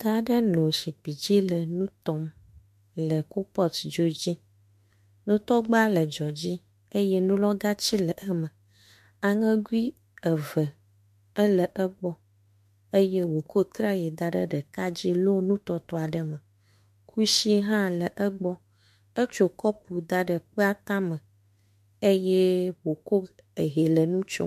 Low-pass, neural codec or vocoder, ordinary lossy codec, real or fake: 14.4 kHz; autoencoder, 48 kHz, 32 numbers a frame, DAC-VAE, trained on Japanese speech; MP3, 64 kbps; fake